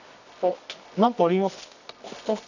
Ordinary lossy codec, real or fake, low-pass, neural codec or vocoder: none; fake; 7.2 kHz; codec, 24 kHz, 0.9 kbps, WavTokenizer, medium music audio release